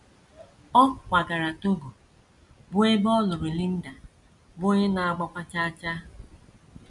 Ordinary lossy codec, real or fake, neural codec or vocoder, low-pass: none; real; none; 10.8 kHz